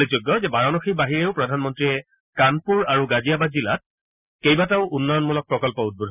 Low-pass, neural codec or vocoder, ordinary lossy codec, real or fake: 3.6 kHz; none; none; real